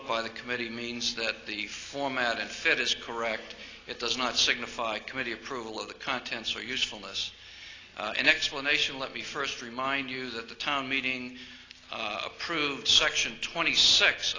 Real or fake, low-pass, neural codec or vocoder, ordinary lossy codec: real; 7.2 kHz; none; AAC, 32 kbps